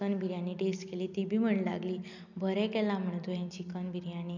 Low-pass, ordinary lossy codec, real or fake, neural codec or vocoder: 7.2 kHz; none; real; none